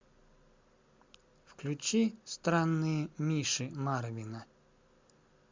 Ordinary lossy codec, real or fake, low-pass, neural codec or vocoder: MP3, 64 kbps; real; 7.2 kHz; none